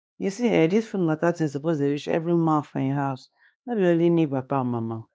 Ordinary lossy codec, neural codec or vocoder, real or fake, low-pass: none; codec, 16 kHz, 2 kbps, X-Codec, HuBERT features, trained on LibriSpeech; fake; none